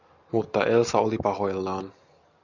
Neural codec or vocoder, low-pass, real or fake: none; 7.2 kHz; real